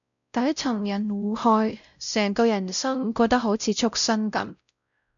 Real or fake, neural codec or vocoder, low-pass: fake; codec, 16 kHz, 0.5 kbps, X-Codec, WavLM features, trained on Multilingual LibriSpeech; 7.2 kHz